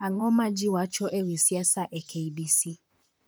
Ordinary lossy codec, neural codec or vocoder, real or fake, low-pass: none; vocoder, 44.1 kHz, 128 mel bands, Pupu-Vocoder; fake; none